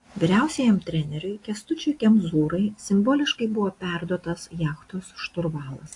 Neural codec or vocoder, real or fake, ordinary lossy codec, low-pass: none; real; AAC, 48 kbps; 10.8 kHz